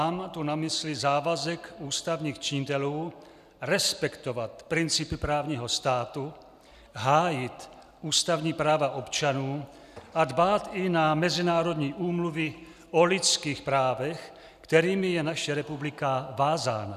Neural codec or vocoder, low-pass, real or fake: none; 14.4 kHz; real